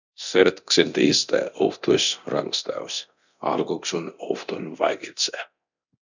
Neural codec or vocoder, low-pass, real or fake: codec, 24 kHz, 0.9 kbps, DualCodec; 7.2 kHz; fake